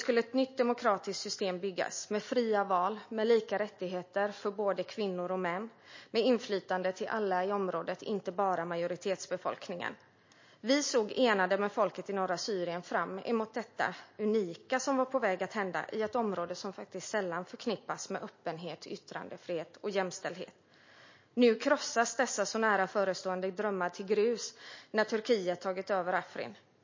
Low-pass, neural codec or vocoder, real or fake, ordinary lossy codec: 7.2 kHz; none; real; MP3, 32 kbps